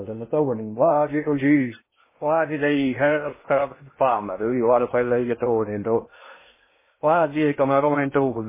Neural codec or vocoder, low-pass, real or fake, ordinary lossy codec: codec, 16 kHz in and 24 kHz out, 0.6 kbps, FocalCodec, streaming, 2048 codes; 3.6 kHz; fake; MP3, 16 kbps